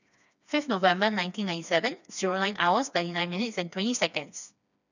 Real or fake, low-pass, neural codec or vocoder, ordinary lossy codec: fake; 7.2 kHz; codec, 16 kHz, 2 kbps, FreqCodec, smaller model; none